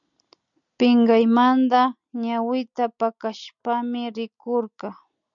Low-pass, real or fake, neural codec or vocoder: 7.2 kHz; real; none